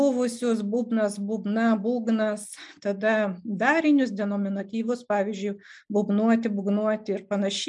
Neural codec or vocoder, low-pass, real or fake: none; 10.8 kHz; real